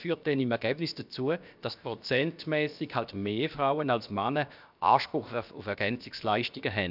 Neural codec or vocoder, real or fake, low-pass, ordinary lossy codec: codec, 16 kHz, about 1 kbps, DyCAST, with the encoder's durations; fake; 5.4 kHz; none